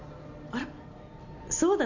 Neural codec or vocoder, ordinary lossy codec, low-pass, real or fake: none; none; 7.2 kHz; real